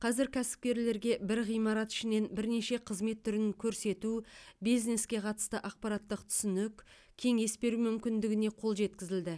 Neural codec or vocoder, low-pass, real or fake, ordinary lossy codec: none; none; real; none